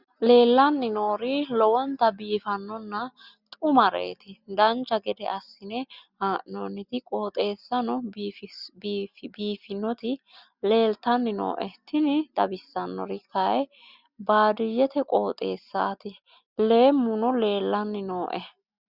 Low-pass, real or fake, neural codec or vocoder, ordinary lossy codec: 5.4 kHz; real; none; Opus, 64 kbps